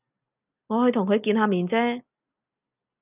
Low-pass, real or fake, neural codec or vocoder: 3.6 kHz; real; none